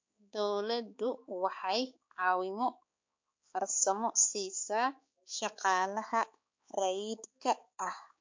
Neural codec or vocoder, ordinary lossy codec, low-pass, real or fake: codec, 16 kHz, 4 kbps, X-Codec, HuBERT features, trained on balanced general audio; MP3, 48 kbps; 7.2 kHz; fake